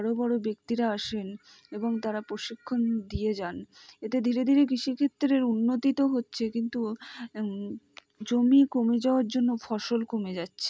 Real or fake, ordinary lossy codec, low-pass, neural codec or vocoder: real; none; none; none